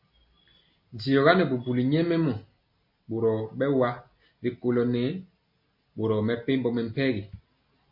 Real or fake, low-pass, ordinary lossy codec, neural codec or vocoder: real; 5.4 kHz; MP3, 32 kbps; none